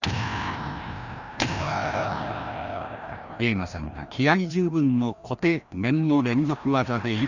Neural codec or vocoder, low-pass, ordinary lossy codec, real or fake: codec, 16 kHz, 1 kbps, FreqCodec, larger model; 7.2 kHz; none; fake